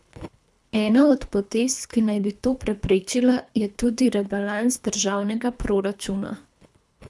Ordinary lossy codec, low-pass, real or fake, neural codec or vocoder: none; none; fake; codec, 24 kHz, 3 kbps, HILCodec